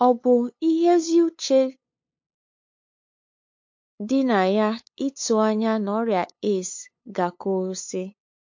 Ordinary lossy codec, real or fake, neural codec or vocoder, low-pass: MP3, 48 kbps; fake; codec, 16 kHz, 8 kbps, FunCodec, trained on LibriTTS, 25 frames a second; 7.2 kHz